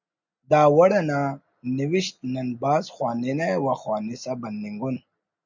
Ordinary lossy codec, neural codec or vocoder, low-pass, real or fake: MP3, 64 kbps; none; 7.2 kHz; real